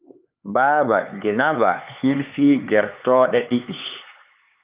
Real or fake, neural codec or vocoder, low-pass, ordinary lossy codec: fake; codec, 16 kHz, 4 kbps, X-Codec, HuBERT features, trained on LibriSpeech; 3.6 kHz; Opus, 24 kbps